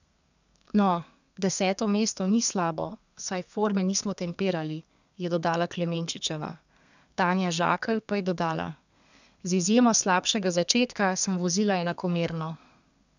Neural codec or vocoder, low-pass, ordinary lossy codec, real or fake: codec, 32 kHz, 1.9 kbps, SNAC; 7.2 kHz; none; fake